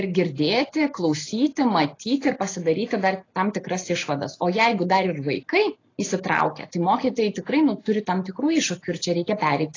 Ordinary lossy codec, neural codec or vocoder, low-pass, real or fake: AAC, 32 kbps; none; 7.2 kHz; real